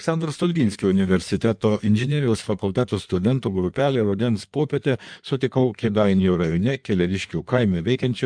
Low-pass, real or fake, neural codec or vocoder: 9.9 kHz; fake; codec, 16 kHz in and 24 kHz out, 1.1 kbps, FireRedTTS-2 codec